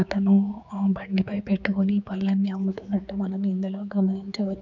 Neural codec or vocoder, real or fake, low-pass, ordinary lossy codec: codec, 16 kHz, 4 kbps, X-Codec, HuBERT features, trained on general audio; fake; 7.2 kHz; none